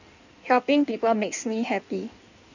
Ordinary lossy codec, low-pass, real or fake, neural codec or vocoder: none; 7.2 kHz; fake; codec, 16 kHz in and 24 kHz out, 1.1 kbps, FireRedTTS-2 codec